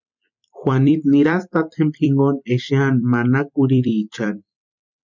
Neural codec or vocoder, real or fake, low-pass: vocoder, 44.1 kHz, 128 mel bands every 256 samples, BigVGAN v2; fake; 7.2 kHz